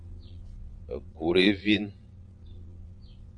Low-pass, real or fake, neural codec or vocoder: 9.9 kHz; fake; vocoder, 22.05 kHz, 80 mel bands, Vocos